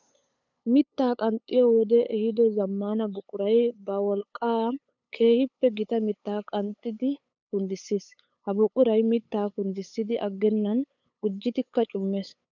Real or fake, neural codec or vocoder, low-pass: fake; codec, 16 kHz, 8 kbps, FunCodec, trained on LibriTTS, 25 frames a second; 7.2 kHz